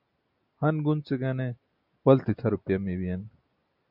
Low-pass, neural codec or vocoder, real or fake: 5.4 kHz; none; real